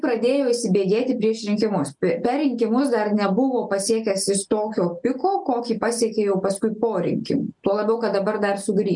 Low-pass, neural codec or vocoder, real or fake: 10.8 kHz; none; real